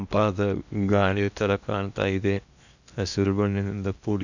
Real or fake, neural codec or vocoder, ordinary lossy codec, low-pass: fake; codec, 16 kHz in and 24 kHz out, 0.8 kbps, FocalCodec, streaming, 65536 codes; none; 7.2 kHz